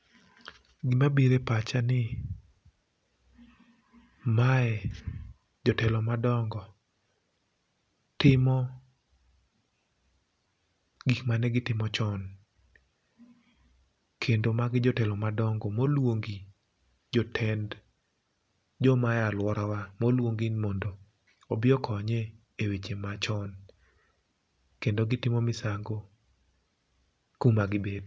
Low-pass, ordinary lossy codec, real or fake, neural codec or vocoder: none; none; real; none